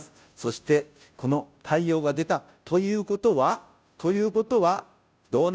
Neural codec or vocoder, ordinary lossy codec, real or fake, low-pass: codec, 16 kHz, 0.5 kbps, FunCodec, trained on Chinese and English, 25 frames a second; none; fake; none